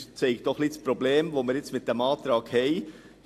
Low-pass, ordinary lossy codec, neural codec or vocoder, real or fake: 14.4 kHz; AAC, 64 kbps; none; real